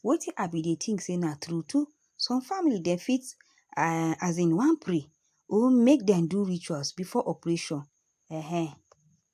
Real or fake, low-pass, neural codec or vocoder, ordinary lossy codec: real; 14.4 kHz; none; AAC, 96 kbps